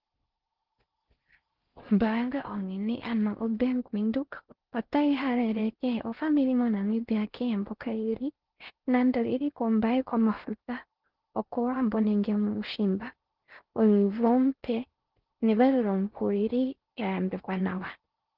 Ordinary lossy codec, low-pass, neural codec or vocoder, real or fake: Opus, 16 kbps; 5.4 kHz; codec, 16 kHz in and 24 kHz out, 0.6 kbps, FocalCodec, streaming, 4096 codes; fake